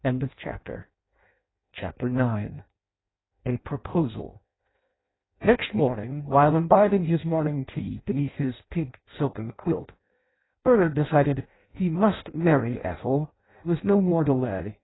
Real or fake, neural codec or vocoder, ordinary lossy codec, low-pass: fake; codec, 16 kHz in and 24 kHz out, 0.6 kbps, FireRedTTS-2 codec; AAC, 16 kbps; 7.2 kHz